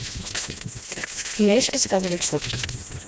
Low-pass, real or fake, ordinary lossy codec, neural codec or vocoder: none; fake; none; codec, 16 kHz, 1 kbps, FreqCodec, smaller model